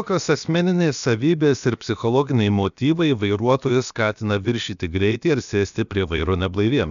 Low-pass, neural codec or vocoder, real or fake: 7.2 kHz; codec, 16 kHz, about 1 kbps, DyCAST, with the encoder's durations; fake